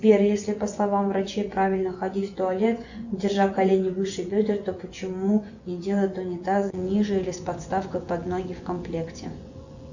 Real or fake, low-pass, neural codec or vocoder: fake; 7.2 kHz; vocoder, 24 kHz, 100 mel bands, Vocos